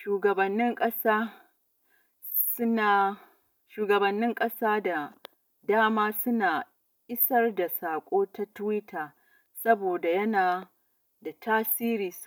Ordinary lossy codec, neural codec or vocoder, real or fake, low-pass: none; none; real; none